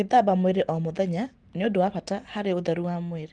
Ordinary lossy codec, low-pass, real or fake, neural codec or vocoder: Opus, 24 kbps; 9.9 kHz; real; none